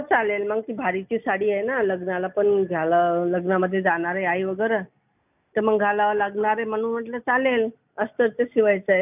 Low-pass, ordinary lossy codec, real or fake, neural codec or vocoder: 3.6 kHz; none; real; none